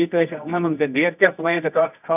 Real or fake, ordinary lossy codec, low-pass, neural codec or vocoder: fake; none; 3.6 kHz; codec, 24 kHz, 0.9 kbps, WavTokenizer, medium music audio release